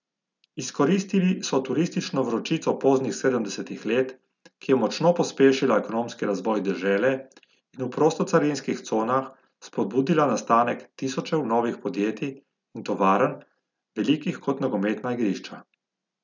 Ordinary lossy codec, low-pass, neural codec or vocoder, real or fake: none; 7.2 kHz; none; real